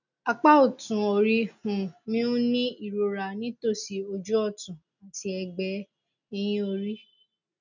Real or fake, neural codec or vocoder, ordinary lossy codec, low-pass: real; none; none; 7.2 kHz